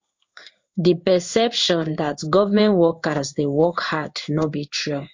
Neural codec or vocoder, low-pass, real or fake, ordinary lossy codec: codec, 16 kHz in and 24 kHz out, 1 kbps, XY-Tokenizer; 7.2 kHz; fake; MP3, 48 kbps